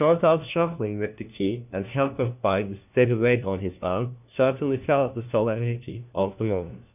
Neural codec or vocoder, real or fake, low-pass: codec, 16 kHz, 1 kbps, FunCodec, trained on LibriTTS, 50 frames a second; fake; 3.6 kHz